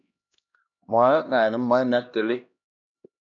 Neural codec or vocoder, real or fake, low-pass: codec, 16 kHz, 1 kbps, X-Codec, HuBERT features, trained on LibriSpeech; fake; 7.2 kHz